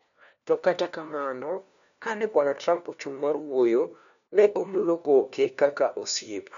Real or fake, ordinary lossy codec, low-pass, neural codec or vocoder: fake; MP3, 96 kbps; 7.2 kHz; codec, 16 kHz, 1 kbps, FunCodec, trained on LibriTTS, 50 frames a second